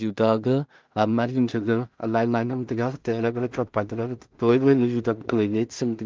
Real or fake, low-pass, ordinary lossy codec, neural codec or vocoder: fake; 7.2 kHz; Opus, 32 kbps; codec, 16 kHz in and 24 kHz out, 0.4 kbps, LongCat-Audio-Codec, two codebook decoder